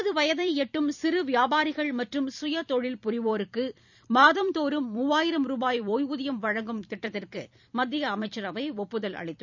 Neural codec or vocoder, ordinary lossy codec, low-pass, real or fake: vocoder, 44.1 kHz, 128 mel bands every 256 samples, BigVGAN v2; none; 7.2 kHz; fake